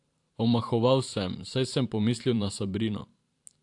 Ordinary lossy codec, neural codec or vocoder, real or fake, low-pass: AAC, 64 kbps; vocoder, 44.1 kHz, 128 mel bands every 512 samples, BigVGAN v2; fake; 10.8 kHz